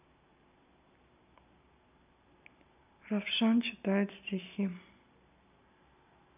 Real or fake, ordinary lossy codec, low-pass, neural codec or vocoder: real; none; 3.6 kHz; none